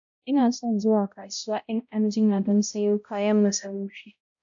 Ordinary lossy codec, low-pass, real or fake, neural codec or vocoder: AAC, 64 kbps; 7.2 kHz; fake; codec, 16 kHz, 0.5 kbps, X-Codec, HuBERT features, trained on balanced general audio